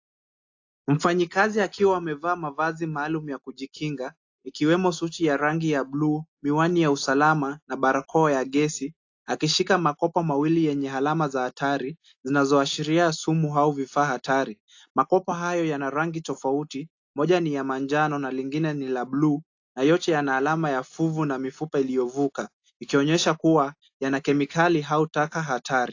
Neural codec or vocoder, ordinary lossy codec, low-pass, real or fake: none; AAC, 48 kbps; 7.2 kHz; real